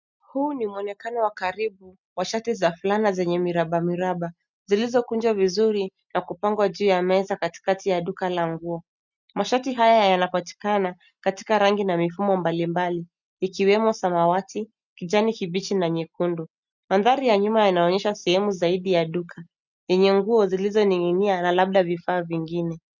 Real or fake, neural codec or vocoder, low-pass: real; none; 7.2 kHz